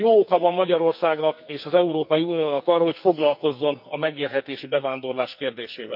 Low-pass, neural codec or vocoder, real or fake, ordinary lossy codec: 5.4 kHz; codec, 44.1 kHz, 2.6 kbps, SNAC; fake; none